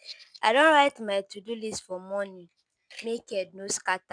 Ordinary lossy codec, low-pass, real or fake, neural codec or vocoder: none; 9.9 kHz; real; none